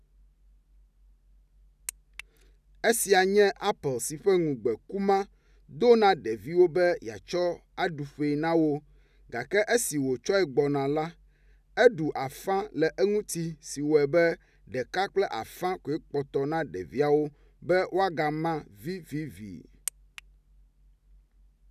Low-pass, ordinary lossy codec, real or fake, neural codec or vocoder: 14.4 kHz; none; real; none